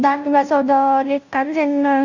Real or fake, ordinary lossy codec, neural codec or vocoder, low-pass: fake; none; codec, 16 kHz, 0.5 kbps, FunCodec, trained on Chinese and English, 25 frames a second; 7.2 kHz